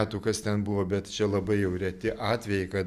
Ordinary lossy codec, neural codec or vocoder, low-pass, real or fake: Opus, 64 kbps; none; 14.4 kHz; real